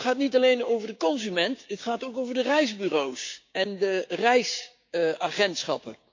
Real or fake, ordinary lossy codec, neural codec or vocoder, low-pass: fake; MP3, 48 kbps; codec, 16 kHz, 6 kbps, DAC; 7.2 kHz